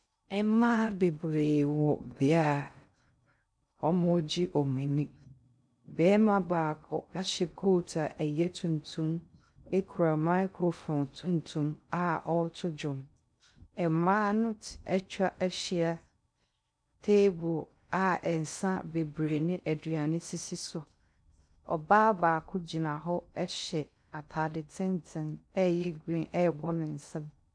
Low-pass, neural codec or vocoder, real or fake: 9.9 kHz; codec, 16 kHz in and 24 kHz out, 0.6 kbps, FocalCodec, streaming, 2048 codes; fake